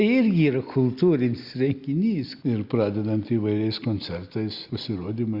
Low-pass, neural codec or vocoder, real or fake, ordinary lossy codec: 5.4 kHz; none; real; Opus, 64 kbps